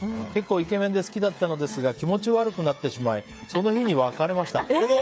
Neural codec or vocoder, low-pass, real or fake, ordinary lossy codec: codec, 16 kHz, 8 kbps, FreqCodec, smaller model; none; fake; none